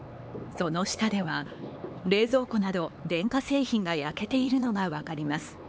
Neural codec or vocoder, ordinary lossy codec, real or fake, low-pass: codec, 16 kHz, 4 kbps, X-Codec, HuBERT features, trained on LibriSpeech; none; fake; none